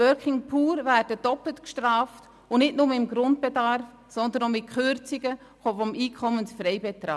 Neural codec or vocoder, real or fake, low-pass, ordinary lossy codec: none; real; none; none